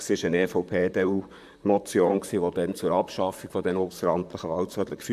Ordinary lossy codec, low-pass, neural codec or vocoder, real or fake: AAC, 96 kbps; 14.4 kHz; vocoder, 44.1 kHz, 128 mel bands, Pupu-Vocoder; fake